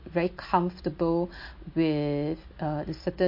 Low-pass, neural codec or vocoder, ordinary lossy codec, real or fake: 5.4 kHz; none; MP3, 32 kbps; real